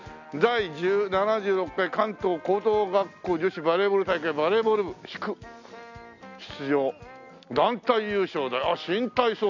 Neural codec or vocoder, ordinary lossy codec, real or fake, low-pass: none; none; real; 7.2 kHz